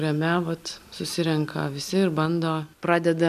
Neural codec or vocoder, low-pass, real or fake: none; 14.4 kHz; real